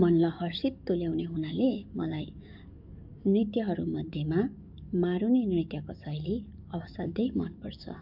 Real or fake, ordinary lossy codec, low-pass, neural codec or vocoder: fake; none; 5.4 kHz; codec, 44.1 kHz, 7.8 kbps, DAC